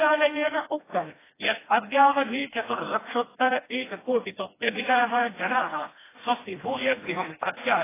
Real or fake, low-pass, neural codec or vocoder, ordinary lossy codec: fake; 3.6 kHz; codec, 16 kHz, 1 kbps, FreqCodec, smaller model; AAC, 16 kbps